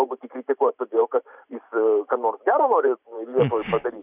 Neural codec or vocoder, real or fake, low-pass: vocoder, 44.1 kHz, 128 mel bands every 512 samples, BigVGAN v2; fake; 3.6 kHz